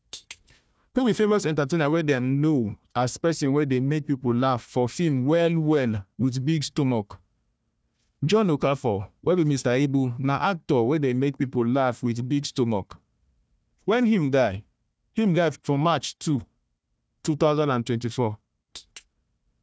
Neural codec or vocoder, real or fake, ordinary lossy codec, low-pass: codec, 16 kHz, 1 kbps, FunCodec, trained on Chinese and English, 50 frames a second; fake; none; none